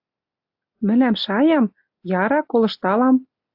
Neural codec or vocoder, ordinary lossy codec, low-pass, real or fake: none; AAC, 48 kbps; 5.4 kHz; real